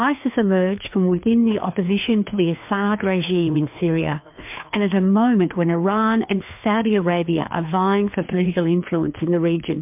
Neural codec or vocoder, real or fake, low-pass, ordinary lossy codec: codec, 16 kHz, 2 kbps, FreqCodec, larger model; fake; 3.6 kHz; MP3, 32 kbps